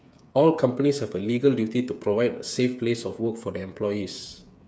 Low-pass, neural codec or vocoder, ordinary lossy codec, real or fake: none; codec, 16 kHz, 8 kbps, FreqCodec, smaller model; none; fake